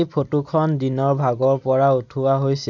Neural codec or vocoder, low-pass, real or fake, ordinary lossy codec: none; 7.2 kHz; real; none